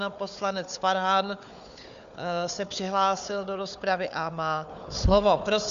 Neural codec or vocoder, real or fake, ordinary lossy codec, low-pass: codec, 16 kHz, 4 kbps, FunCodec, trained on Chinese and English, 50 frames a second; fake; MP3, 64 kbps; 7.2 kHz